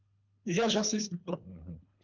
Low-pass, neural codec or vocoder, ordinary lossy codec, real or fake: 7.2 kHz; codec, 24 kHz, 3 kbps, HILCodec; Opus, 24 kbps; fake